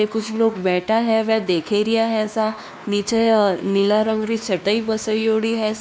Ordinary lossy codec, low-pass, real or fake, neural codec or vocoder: none; none; fake; codec, 16 kHz, 2 kbps, X-Codec, WavLM features, trained on Multilingual LibriSpeech